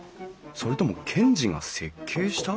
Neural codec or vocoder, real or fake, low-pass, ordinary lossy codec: none; real; none; none